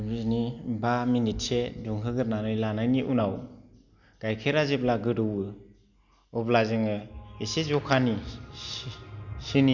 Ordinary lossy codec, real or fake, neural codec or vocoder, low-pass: none; real; none; 7.2 kHz